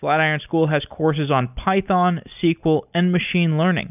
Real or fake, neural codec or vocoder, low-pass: real; none; 3.6 kHz